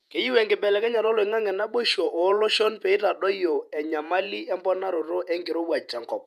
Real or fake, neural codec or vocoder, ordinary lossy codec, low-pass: fake; vocoder, 48 kHz, 128 mel bands, Vocos; MP3, 96 kbps; 14.4 kHz